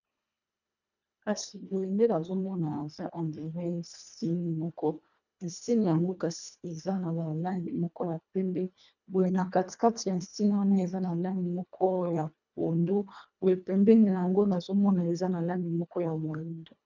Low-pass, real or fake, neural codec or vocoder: 7.2 kHz; fake; codec, 24 kHz, 1.5 kbps, HILCodec